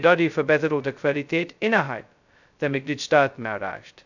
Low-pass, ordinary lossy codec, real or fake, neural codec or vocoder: 7.2 kHz; none; fake; codec, 16 kHz, 0.2 kbps, FocalCodec